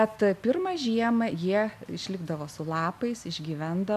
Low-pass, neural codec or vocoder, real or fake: 14.4 kHz; none; real